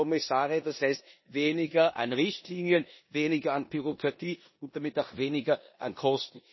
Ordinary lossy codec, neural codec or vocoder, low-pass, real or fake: MP3, 24 kbps; codec, 16 kHz in and 24 kHz out, 0.9 kbps, LongCat-Audio-Codec, four codebook decoder; 7.2 kHz; fake